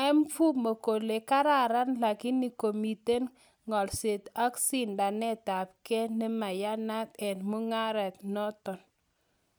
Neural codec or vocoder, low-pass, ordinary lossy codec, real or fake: none; none; none; real